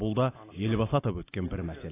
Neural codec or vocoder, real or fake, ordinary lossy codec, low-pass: none; real; AAC, 16 kbps; 3.6 kHz